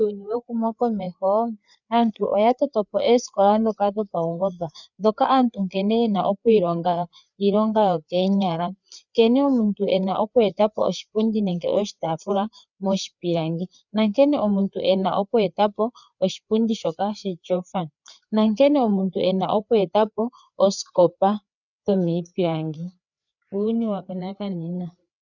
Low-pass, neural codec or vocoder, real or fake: 7.2 kHz; codec, 16 kHz, 4 kbps, FreqCodec, larger model; fake